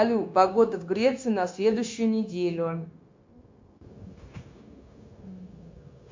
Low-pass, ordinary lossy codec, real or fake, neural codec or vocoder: 7.2 kHz; MP3, 48 kbps; fake; codec, 16 kHz, 0.9 kbps, LongCat-Audio-Codec